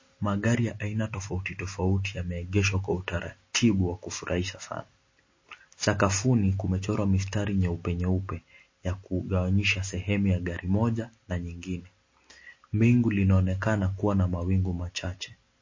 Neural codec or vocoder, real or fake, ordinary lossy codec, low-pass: none; real; MP3, 32 kbps; 7.2 kHz